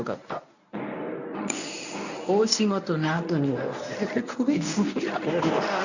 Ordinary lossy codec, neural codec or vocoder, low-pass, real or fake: none; codec, 24 kHz, 0.9 kbps, WavTokenizer, medium speech release version 1; 7.2 kHz; fake